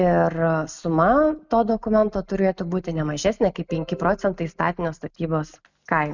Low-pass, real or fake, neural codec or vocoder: 7.2 kHz; real; none